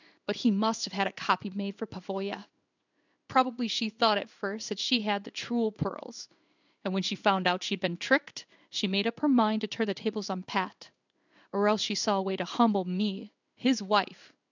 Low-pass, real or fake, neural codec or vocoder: 7.2 kHz; fake; codec, 16 kHz in and 24 kHz out, 1 kbps, XY-Tokenizer